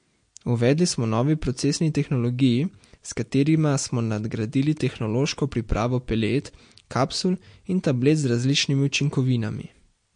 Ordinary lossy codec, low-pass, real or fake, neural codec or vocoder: MP3, 48 kbps; 9.9 kHz; real; none